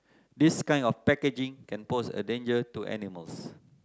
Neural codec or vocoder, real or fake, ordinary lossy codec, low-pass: none; real; none; none